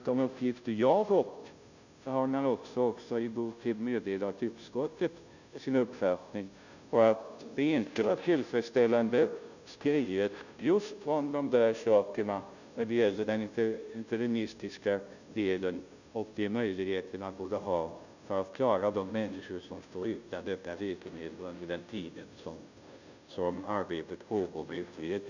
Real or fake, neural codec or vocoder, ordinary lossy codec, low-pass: fake; codec, 16 kHz, 0.5 kbps, FunCodec, trained on Chinese and English, 25 frames a second; none; 7.2 kHz